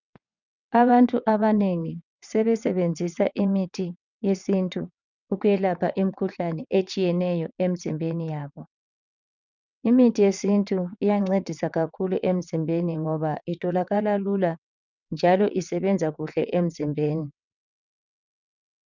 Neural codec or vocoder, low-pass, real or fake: vocoder, 22.05 kHz, 80 mel bands, WaveNeXt; 7.2 kHz; fake